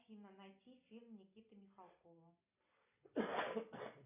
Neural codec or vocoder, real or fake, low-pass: none; real; 3.6 kHz